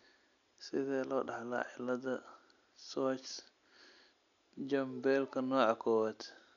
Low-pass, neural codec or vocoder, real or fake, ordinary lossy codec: 7.2 kHz; none; real; none